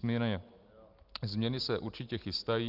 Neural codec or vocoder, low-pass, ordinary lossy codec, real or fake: none; 5.4 kHz; Opus, 32 kbps; real